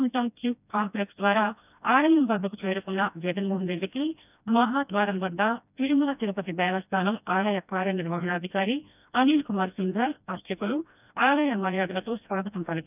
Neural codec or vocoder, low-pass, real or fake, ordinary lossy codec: codec, 16 kHz, 1 kbps, FreqCodec, smaller model; 3.6 kHz; fake; none